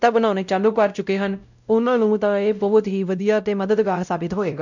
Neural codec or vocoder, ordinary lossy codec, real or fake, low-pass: codec, 16 kHz, 0.5 kbps, X-Codec, WavLM features, trained on Multilingual LibriSpeech; none; fake; 7.2 kHz